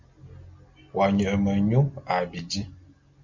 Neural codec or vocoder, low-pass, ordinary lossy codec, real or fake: none; 7.2 kHz; MP3, 64 kbps; real